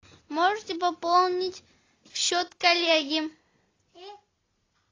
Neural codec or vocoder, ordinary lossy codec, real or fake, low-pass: none; AAC, 32 kbps; real; 7.2 kHz